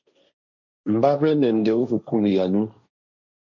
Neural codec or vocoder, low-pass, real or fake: codec, 16 kHz, 1.1 kbps, Voila-Tokenizer; 7.2 kHz; fake